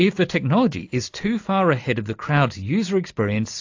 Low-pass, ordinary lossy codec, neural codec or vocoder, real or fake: 7.2 kHz; AAC, 48 kbps; none; real